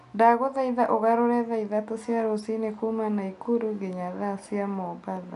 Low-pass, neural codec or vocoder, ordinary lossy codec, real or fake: 10.8 kHz; none; none; real